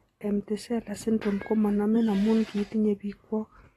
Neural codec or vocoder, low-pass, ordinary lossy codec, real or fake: none; 19.8 kHz; AAC, 32 kbps; real